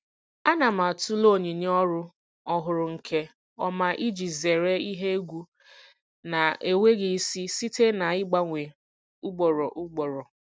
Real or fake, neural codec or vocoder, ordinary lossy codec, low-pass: real; none; none; none